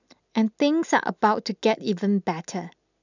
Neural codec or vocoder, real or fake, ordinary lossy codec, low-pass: none; real; none; 7.2 kHz